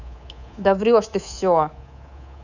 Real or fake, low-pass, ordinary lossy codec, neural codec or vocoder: fake; 7.2 kHz; none; codec, 24 kHz, 3.1 kbps, DualCodec